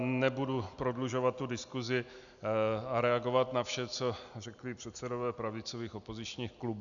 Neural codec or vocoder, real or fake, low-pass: none; real; 7.2 kHz